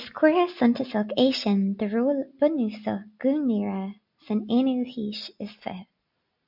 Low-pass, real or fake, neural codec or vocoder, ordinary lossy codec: 5.4 kHz; real; none; MP3, 48 kbps